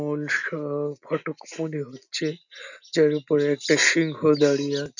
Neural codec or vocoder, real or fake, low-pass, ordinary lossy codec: none; real; 7.2 kHz; none